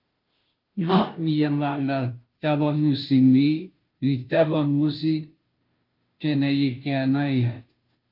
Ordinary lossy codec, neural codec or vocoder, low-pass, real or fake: Opus, 32 kbps; codec, 16 kHz, 0.5 kbps, FunCodec, trained on Chinese and English, 25 frames a second; 5.4 kHz; fake